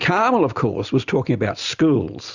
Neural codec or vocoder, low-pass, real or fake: none; 7.2 kHz; real